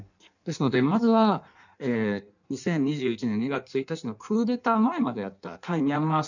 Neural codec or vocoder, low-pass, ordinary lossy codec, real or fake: codec, 16 kHz in and 24 kHz out, 1.1 kbps, FireRedTTS-2 codec; 7.2 kHz; none; fake